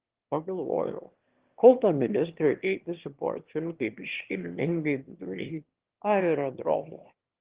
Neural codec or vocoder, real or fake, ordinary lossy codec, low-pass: autoencoder, 22.05 kHz, a latent of 192 numbers a frame, VITS, trained on one speaker; fake; Opus, 16 kbps; 3.6 kHz